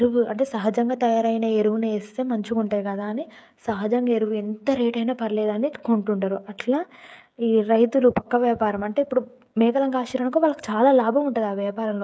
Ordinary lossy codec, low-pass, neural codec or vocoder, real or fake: none; none; codec, 16 kHz, 16 kbps, FreqCodec, smaller model; fake